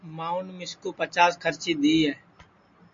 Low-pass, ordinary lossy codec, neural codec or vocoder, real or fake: 7.2 kHz; MP3, 48 kbps; none; real